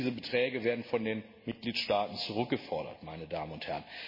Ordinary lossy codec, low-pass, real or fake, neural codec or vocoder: MP3, 24 kbps; 5.4 kHz; real; none